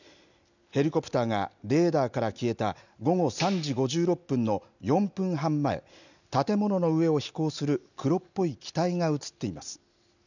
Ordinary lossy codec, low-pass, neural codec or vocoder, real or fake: none; 7.2 kHz; none; real